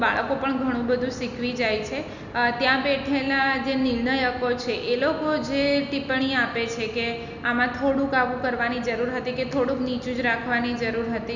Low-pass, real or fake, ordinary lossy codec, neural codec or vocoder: 7.2 kHz; real; none; none